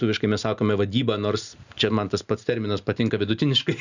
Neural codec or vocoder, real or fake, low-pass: none; real; 7.2 kHz